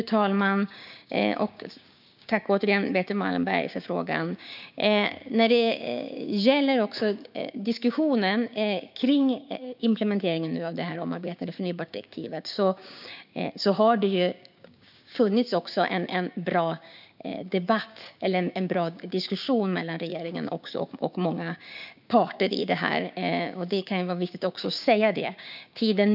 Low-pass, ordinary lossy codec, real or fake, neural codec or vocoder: 5.4 kHz; none; fake; codec, 16 kHz, 6 kbps, DAC